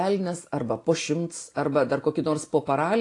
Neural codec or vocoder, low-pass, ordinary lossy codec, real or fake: none; 10.8 kHz; AAC, 48 kbps; real